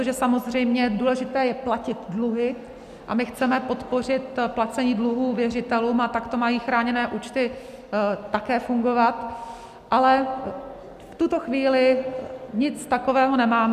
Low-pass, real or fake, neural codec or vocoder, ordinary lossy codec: 14.4 kHz; real; none; MP3, 96 kbps